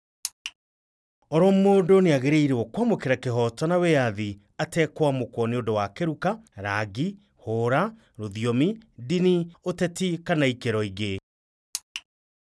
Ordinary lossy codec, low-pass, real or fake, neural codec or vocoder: none; none; real; none